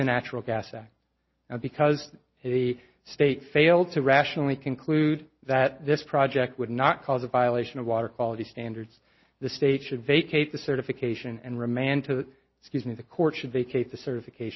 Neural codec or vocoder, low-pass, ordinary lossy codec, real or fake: none; 7.2 kHz; MP3, 24 kbps; real